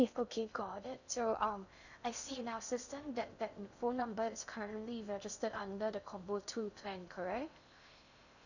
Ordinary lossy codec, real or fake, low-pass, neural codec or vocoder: none; fake; 7.2 kHz; codec, 16 kHz in and 24 kHz out, 0.6 kbps, FocalCodec, streaming, 2048 codes